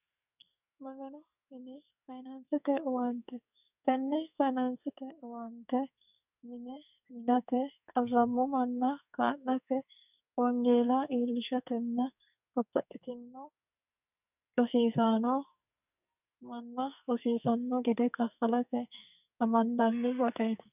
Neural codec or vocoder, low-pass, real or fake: codec, 44.1 kHz, 2.6 kbps, SNAC; 3.6 kHz; fake